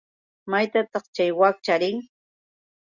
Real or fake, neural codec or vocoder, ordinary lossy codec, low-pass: real; none; Opus, 64 kbps; 7.2 kHz